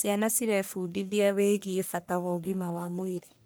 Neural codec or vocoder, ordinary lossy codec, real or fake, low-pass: codec, 44.1 kHz, 1.7 kbps, Pupu-Codec; none; fake; none